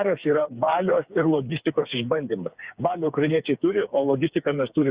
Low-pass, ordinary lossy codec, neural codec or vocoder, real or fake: 3.6 kHz; Opus, 64 kbps; codec, 16 kHz, 4 kbps, FreqCodec, smaller model; fake